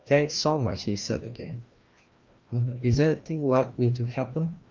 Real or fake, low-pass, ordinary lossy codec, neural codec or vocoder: fake; 7.2 kHz; Opus, 32 kbps; codec, 16 kHz, 1 kbps, FreqCodec, larger model